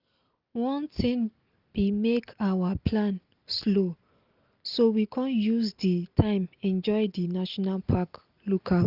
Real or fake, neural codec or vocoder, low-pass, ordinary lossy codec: real; none; 5.4 kHz; Opus, 24 kbps